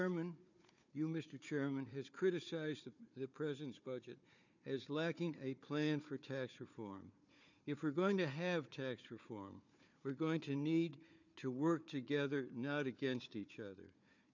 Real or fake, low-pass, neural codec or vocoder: fake; 7.2 kHz; codec, 16 kHz, 4 kbps, FunCodec, trained on Chinese and English, 50 frames a second